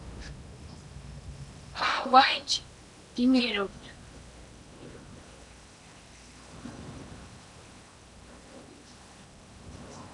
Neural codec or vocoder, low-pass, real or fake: codec, 16 kHz in and 24 kHz out, 0.8 kbps, FocalCodec, streaming, 65536 codes; 10.8 kHz; fake